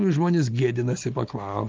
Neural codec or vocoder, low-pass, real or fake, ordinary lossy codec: none; 7.2 kHz; real; Opus, 32 kbps